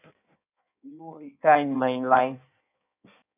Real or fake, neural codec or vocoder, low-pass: fake; codec, 16 kHz in and 24 kHz out, 1.1 kbps, FireRedTTS-2 codec; 3.6 kHz